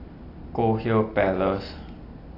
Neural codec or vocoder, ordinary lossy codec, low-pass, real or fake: none; AAC, 32 kbps; 5.4 kHz; real